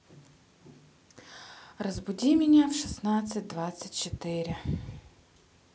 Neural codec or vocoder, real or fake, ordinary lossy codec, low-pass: none; real; none; none